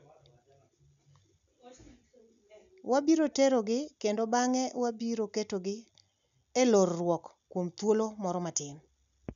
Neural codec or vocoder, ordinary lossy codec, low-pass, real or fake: none; none; 7.2 kHz; real